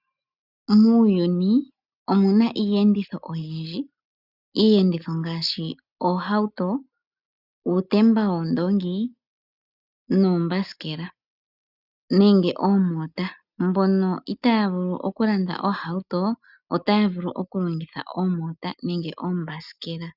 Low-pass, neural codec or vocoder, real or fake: 5.4 kHz; none; real